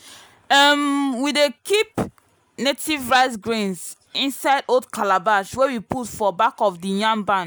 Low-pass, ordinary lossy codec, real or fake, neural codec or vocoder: none; none; real; none